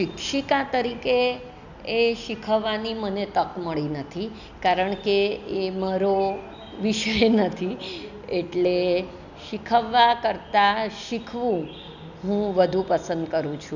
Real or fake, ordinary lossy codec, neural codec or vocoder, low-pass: real; none; none; 7.2 kHz